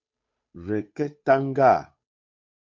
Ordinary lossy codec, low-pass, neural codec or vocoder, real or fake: MP3, 48 kbps; 7.2 kHz; codec, 16 kHz, 8 kbps, FunCodec, trained on Chinese and English, 25 frames a second; fake